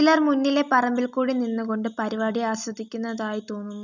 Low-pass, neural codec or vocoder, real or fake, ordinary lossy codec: 7.2 kHz; none; real; none